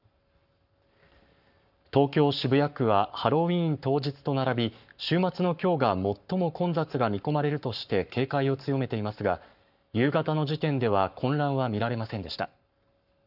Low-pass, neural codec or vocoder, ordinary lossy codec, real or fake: 5.4 kHz; codec, 44.1 kHz, 7.8 kbps, Pupu-Codec; none; fake